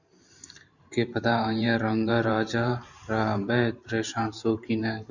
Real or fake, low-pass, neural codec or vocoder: fake; 7.2 kHz; vocoder, 44.1 kHz, 128 mel bands every 512 samples, BigVGAN v2